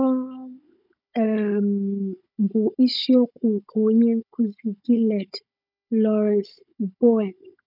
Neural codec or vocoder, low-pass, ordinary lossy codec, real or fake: codec, 16 kHz, 16 kbps, FunCodec, trained on Chinese and English, 50 frames a second; 5.4 kHz; none; fake